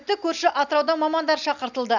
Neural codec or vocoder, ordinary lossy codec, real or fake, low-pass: none; none; real; 7.2 kHz